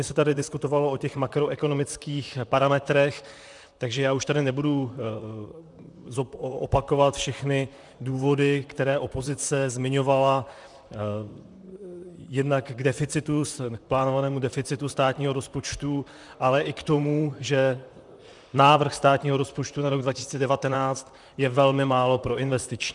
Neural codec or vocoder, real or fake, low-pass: vocoder, 44.1 kHz, 128 mel bands, Pupu-Vocoder; fake; 10.8 kHz